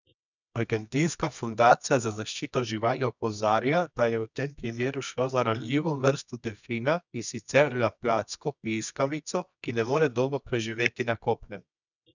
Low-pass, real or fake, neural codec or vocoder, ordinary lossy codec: 7.2 kHz; fake; codec, 24 kHz, 0.9 kbps, WavTokenizer, medium music audio release; none